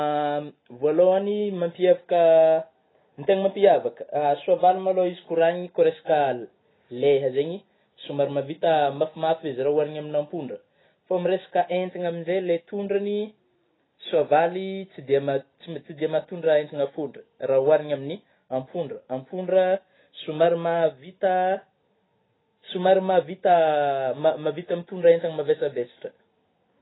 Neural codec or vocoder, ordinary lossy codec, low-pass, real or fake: none; AAC, 16 kbps; 7.2 kHz; real